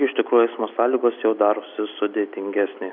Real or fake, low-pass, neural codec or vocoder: real; 5.4 kHz; none